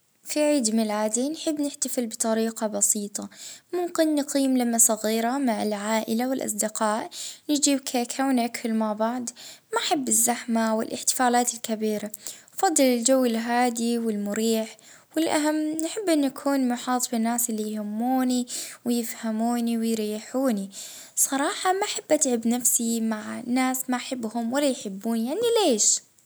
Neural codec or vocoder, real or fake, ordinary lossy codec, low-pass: none; real; none; none